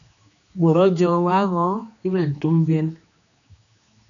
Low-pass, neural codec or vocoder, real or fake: 7.2 kHz; codec, 16 kHz, 2 kbps, X-Codec, HuBERT features, trained on general audio; fake